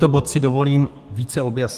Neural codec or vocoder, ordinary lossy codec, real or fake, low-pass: codec, 32 kHz, 1.9 kbps, SNAC; Opus, 24 kbps; fake; 14.4 kHz